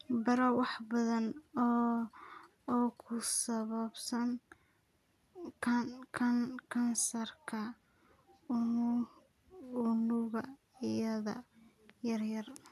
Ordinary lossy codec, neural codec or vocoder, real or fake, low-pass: none; none; real; 14.4 kHz